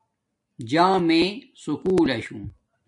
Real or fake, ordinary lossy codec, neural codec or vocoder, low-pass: real; MP3, 48 kbps; none; 10.8 kHz